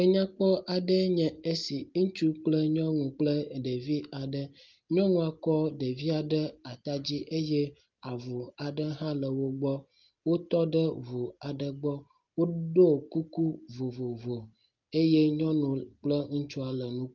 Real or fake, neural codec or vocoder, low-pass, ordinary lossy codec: real; none; 7.2 kHz; Opus, 32 kbps